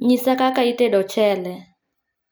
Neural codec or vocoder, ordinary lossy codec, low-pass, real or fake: none; none; none; real